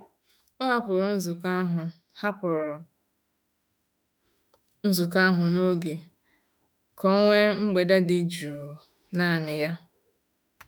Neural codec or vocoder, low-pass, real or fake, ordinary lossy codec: autoencoder, 48 kHz, 32 numbers a frame, DAC-VAE, trained on Japanese speech; none; fake; none